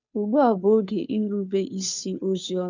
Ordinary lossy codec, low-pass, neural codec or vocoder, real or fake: none; 7.2 kHz; codec, 16 kHz, 2 kbps, FunCodec, trained on Chinese and English, 25 frames a second; fake